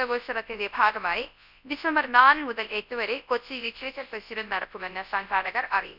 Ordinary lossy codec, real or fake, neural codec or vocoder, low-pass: none; fake; codec, 24 kHz, 0.9 kbps, WavTokenizer, large speech release; 5.4 kHz